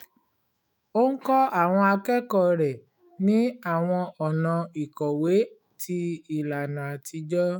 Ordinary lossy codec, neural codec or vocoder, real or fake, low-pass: none; autoencoder, 48 kHz, 128 numbers a frame, DAC-VAE, trained on Japanese speech; fake; none